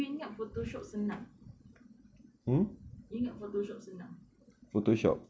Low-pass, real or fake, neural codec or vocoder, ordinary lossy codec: none; real; none; none